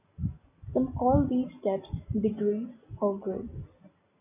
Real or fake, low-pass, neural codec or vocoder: real; 3.6 kHz; none